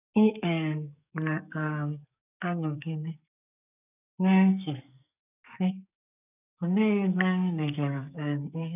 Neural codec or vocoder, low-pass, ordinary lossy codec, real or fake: codec, 44.1 kHz, 2.6 kbps, SNAC; 3.6 kHz; none; fake